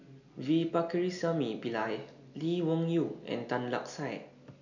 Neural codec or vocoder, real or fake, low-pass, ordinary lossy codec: none; real; 7.2 kHz; none